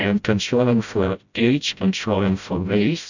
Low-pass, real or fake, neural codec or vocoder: 7.2 kHz; fake; codec, 16 kHz, 0.5 kbps, FreqCodec, smaller model